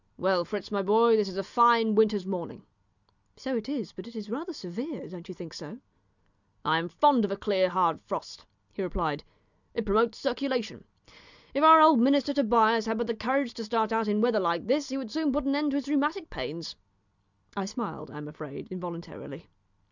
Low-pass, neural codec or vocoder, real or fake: 7.2 kHz; none; real